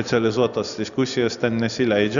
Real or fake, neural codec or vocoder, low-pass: real; none; 7.2 kHz